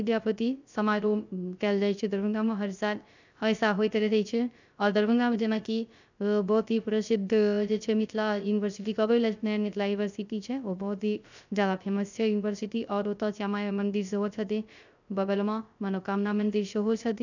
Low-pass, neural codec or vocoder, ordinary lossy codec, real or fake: 7.2 kHz; codec, 16 kHz, 0.3 kbps, FocalCodec; none; fake